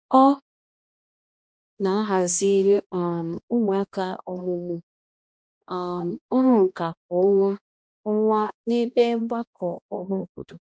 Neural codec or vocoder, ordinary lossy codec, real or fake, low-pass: codec, 16 kHz, 1 kbps, X-Codec, HuBERT features, trained on balanced general audio; none; fake; none